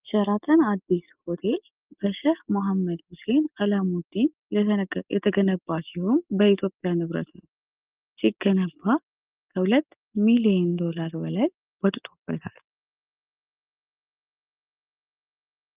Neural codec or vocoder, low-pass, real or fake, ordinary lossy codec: none; 3.6 kHz; real; Opus, 32 kbps